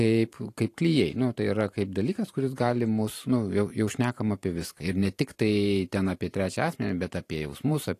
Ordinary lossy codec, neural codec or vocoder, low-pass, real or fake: AAC, 48 kbps; vocoder, 44.1 kHz, 128 mel bands every 512 samples, BigVGAN v2; 14.4 kHz; fake